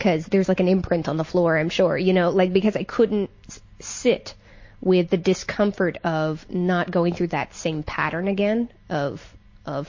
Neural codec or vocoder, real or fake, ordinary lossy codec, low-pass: none; real; MP3, 32 kbps; 7.2 kHz